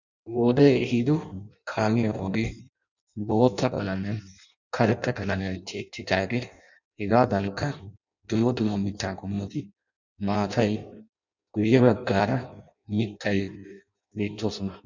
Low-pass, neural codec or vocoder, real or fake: 7.2 kHz; codec, 16 kHz in and 24 kHz out, 0.6 kbps, FireRedTTS-2 codec; fake